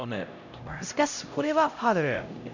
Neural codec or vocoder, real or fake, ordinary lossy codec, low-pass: codec, 16 kHz, 0.5 kbps, X-Codec, HuBERT features, trained on LibriSpeech; fake; none; 7.2 kHz